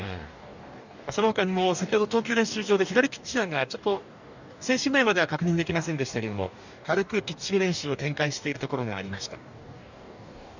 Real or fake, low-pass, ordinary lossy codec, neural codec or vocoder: fake; 7.2 kHz; none; codec, 44.1 kHz, 2.6 kbps, DAC